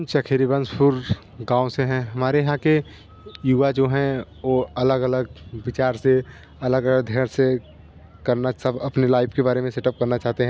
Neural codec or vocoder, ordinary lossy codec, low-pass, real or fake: none; none; none; real